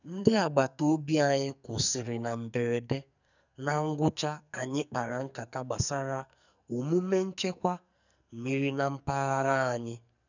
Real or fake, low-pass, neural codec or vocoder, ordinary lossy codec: fake; 7.2 kHz; codec, 44.1 kHz, 2.6 kbps, SNAC; none